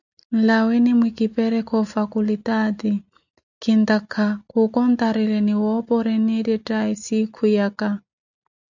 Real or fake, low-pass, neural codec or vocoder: real; 7.2 kHz; none